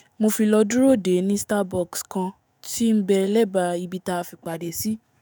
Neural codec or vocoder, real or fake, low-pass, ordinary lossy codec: autoencoder, 48 kHz, 128 numbers a frame, DAC-VAE, trained on Japanese speech; fake; none; none